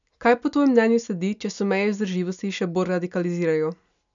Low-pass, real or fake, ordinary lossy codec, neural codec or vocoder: 7.2 kHz; real; none; none